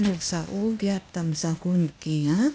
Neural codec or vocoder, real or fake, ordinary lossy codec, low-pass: codec, 16 kHz, 0.8 kbps, ZipCodec; fake; none; none